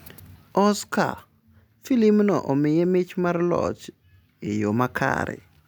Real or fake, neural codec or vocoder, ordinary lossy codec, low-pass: real; none; none; none